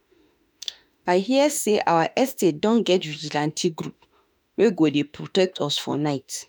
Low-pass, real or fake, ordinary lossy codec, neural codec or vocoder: none; fake; none; autoencoder, 48 kHz, 32 numbers a frame, DAC-VAE, trained on Japanese speech